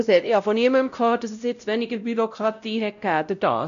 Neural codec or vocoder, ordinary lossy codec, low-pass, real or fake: codec, 16 kHz, 0.5 kbps, X-Codec, WavLM features, trained on Multilingual LibriSpeech; MP3, 96 kbps; 7.2 kHz; fake